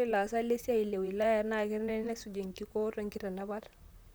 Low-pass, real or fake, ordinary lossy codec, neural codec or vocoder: none; fake; none; vocoder, 44.1 kHz, 128 mel bands, Pupu-Vocoder